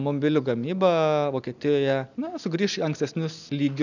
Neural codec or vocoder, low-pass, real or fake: none; 7.2 kHz; real